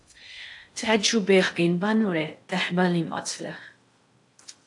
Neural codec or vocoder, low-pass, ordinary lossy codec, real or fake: codec, 16 kHz in and 24 kHz out, 0.6 kbps, FocalCodec, streaming, 4096 codes; 10.8 kHz; AAC, 64 kbps; fake